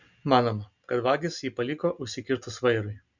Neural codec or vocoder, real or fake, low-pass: none; real; 7.2 kHz